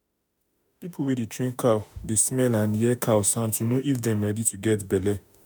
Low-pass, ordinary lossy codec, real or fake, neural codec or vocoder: none; none; fake; autoencoder, 48 kHz, 32 numbers a frame, DAC-VAE, trained on Japanese speech